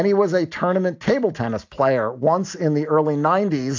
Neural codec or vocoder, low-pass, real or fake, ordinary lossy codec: none; 7.2 kHz; real; AAC, 48 kbps